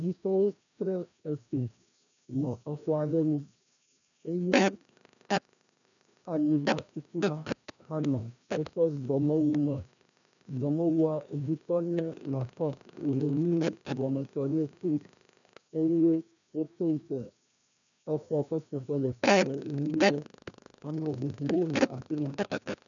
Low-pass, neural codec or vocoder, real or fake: 7.2 kHz; codec, 16 kHz, 1 kbps, FreqCodec, larger model; fake